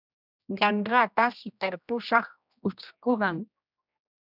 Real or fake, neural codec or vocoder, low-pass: fake; codec, 16 kHz, 1 kbps, X-Codec, HuBERT features, trained on general audio; 5.4 kHz